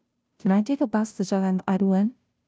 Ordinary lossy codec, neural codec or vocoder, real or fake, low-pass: none; codec, 16 kHz, 0.5 kbps, FunCodec, trained on Chinese and English, 25 frames a second; fake; none